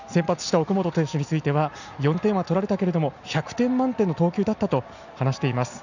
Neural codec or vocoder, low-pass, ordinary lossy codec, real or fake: none; 7.2 kHz; none; real